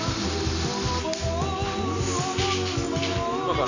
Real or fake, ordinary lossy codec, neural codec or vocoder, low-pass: real; none; none; 7.2 kHz